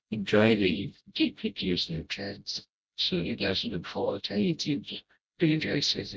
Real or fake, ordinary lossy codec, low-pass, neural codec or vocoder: fake; none; none; codec, 16 kHz, 0.5 kbps, FreqCodec, smaller model